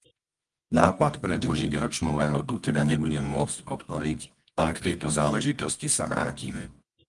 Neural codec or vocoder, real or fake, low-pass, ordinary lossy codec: codec, 24 kHz, 0.9 kbps, WavTokenizer, medium music audio release; fake; 10.8 kHz; Opus, 32 kbps